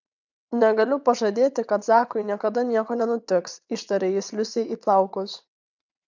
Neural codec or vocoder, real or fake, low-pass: vocoder, 22.05 kHz, 80 mel bands, WaveNeXt; fake; 7.2 kHz